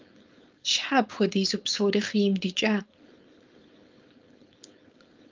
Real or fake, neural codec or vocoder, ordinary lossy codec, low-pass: fake; codec, 16 kHz, 4.8 kbps, FACodec; Opus, 24 kbps; 7.2 kHz